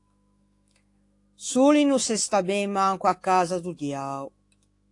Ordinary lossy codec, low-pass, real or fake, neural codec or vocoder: AAC, 48 kbps; 10.8 kHz; fake; autoencoder, 48 kHz, 128 numbers a frame, DAC-VAE, trained on Japanese speech